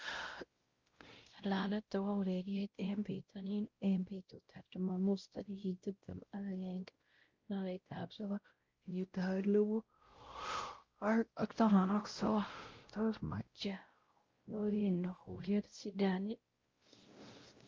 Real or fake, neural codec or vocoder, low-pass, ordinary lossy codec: fake; codec, 16 kHz, 0.5 kbps, X-Codec, WavLM features, trained on Multilingual LibriSpeech; 7.2 kHz; Opus, 24 kbps